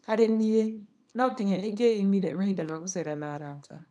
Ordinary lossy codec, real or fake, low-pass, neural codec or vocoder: none; fake; none; codec, 24 kHz, 0.9 kbps, WavTokenizer, small release